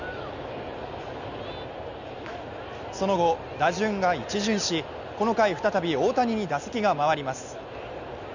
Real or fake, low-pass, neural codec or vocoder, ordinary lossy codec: real; 7.2 kHz; none; none